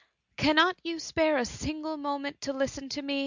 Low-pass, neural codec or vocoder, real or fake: 7.2 kHz; none; real